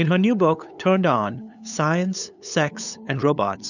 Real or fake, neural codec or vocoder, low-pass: fake; codec, 16 kHz, 8 kbps, FunCodec, trained on LibriTTS, 25 frames a second; 7.2 kHz